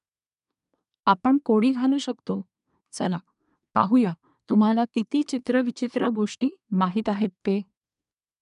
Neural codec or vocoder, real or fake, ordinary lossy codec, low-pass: codec, 24 kHz, 1 kbps, SNAC; fake; none; 10.8 kHz